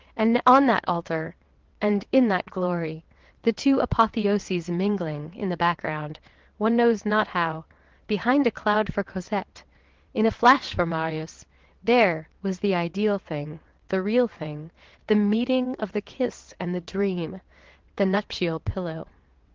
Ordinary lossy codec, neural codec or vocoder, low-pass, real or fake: Opus, 16 kbps; vocoder, 22.05 kHz, 80 mel bands, WaveNeXt; 7.2 kHz; fake